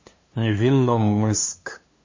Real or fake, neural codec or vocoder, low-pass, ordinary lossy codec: fake; codec, 16 kHz, 2 kbps, FunCodec, trained on LibriTTS, 25 frames a second; 7.2 kHz; MP3, 32 kbps